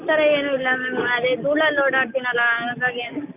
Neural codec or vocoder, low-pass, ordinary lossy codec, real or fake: none; 3.6 kHz; none; real